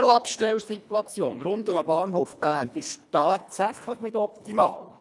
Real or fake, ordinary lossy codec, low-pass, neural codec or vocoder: fake; none; none; codec, 24 kHz, 1.5 kbps, HILCodec